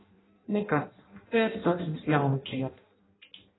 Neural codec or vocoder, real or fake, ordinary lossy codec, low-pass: codec, 16 kHz in and 24 kHz out, 0.6 kbps, FireRedTTS-2 codec; fake; AAC, 16 kbps; 7.2 kHz